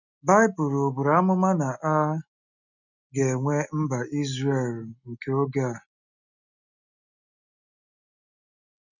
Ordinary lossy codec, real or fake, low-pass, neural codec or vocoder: none; real; 7.2 kHz; none